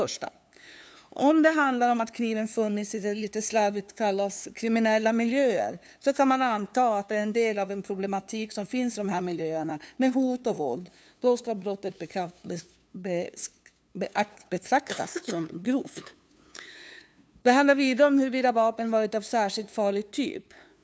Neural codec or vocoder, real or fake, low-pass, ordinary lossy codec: codec, 16 kHz, 2 kbps, FunCodec, trained on LibriTTS, 25 frames a second; fake; none; none